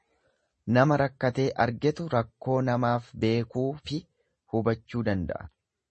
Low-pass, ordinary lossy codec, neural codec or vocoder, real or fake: 10.8 kHz; MP3, 32 kbps; none; real